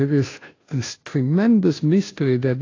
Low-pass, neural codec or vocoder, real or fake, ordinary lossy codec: 7.2 kHz; codec, 16 kHz, 0.5 kbps, FunCodec, trained on Chinese and English, 25 frames a second; fake; AAC, 32 kbps